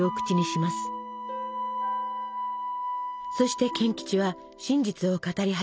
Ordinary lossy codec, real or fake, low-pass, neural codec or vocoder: none; real; none; none